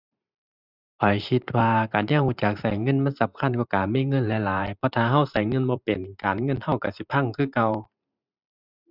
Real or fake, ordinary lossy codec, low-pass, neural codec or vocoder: fake; none; 5.4 kHz; vocoder, 44.1 kHz, 128 mel bands every 512 samples, BigVGAN v2